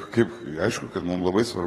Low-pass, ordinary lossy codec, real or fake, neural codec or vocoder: 19.8 kHz; AAC, 32 kbps; fake; codec, 44.1 kHz, 7.8 kbps, DAC